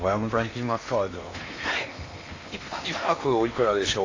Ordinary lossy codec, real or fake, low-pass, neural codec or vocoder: AAC, 32 kbps; fake; 7.2 kHz; codec, 16 kHz in and 24 kHz out, 0.8 kbps, FocalCodec, streaming, 65536 codes